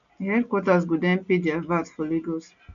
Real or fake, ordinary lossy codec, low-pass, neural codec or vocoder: real; none; 7.2 kHz; none